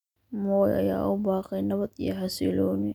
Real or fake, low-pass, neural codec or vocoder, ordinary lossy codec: real; 19.8 kHz; none; none